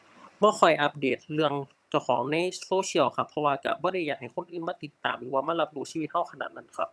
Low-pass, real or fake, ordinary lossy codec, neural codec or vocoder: none; fake; none; vocoder, 22.05 kHz, 80 mel bands, HiFi-GAN